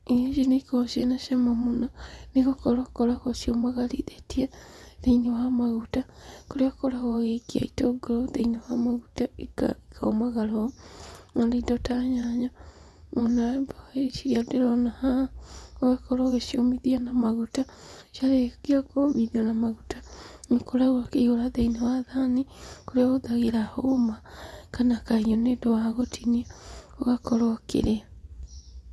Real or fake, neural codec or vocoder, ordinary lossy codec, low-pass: fake; vocoder, 24 kHz, 100 mel bands, Vocos; none; none